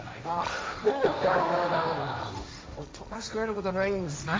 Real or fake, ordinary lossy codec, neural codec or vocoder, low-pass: fake; none; codec, 16 kHz, 1.1 kbps, Voila-Tokenizer; none